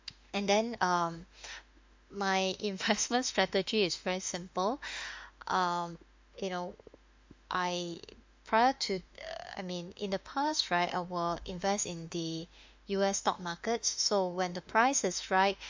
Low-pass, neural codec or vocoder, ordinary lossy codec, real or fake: 7.2 kHz; autoencoder, 48 kHz, 32 numbers a frame, DAC-VAE, trained on Japanese speech; MP3, 64 kbps; fake